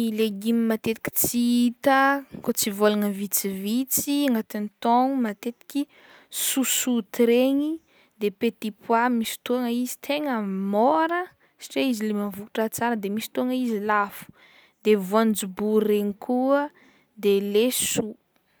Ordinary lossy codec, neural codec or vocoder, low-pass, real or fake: none; none; none; real